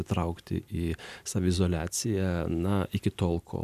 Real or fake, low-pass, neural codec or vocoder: fake; 14.4 kHz; vocoder, 44.1 kHz, 128 mel bands every 256 samples, BigVGAN v2